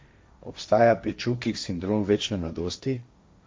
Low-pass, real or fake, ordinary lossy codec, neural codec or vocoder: none; fake; none; codec, 16 kHz, 1.1 kbps, Voila-Tokenizer